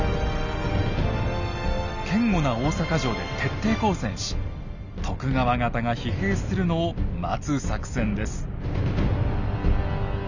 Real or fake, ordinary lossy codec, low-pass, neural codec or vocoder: real; none; 7.2 kHz; none